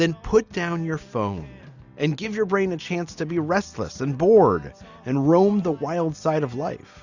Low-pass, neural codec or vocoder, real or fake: 7.2 kHz; none; real